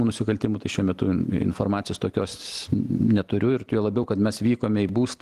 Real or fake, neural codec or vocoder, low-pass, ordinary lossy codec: real; none; 14.4 kHz; Opus, 24 kbps